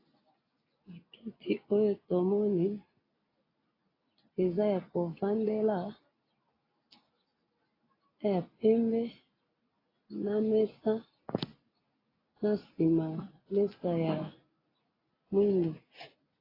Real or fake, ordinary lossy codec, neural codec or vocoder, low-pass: real; AAC, 24 kbps; none; 5.4 kHz